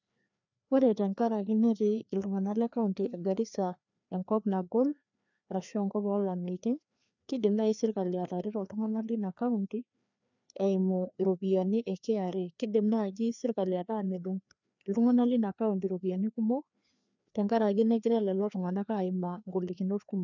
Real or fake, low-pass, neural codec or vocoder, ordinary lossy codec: fake; 7.2 kHz; codec, 16 kHz, 2 kbps, FreqCodec, larger model; none